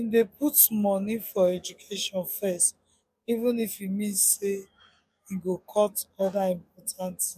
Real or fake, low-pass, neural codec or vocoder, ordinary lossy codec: fake; 14.4 kHz; codec, 44.1 kHz, 7.8 kbps, DAC; MP3, 96 kbps